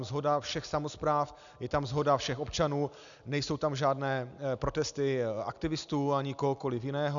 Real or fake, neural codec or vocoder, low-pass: real; none; 7.2 kHz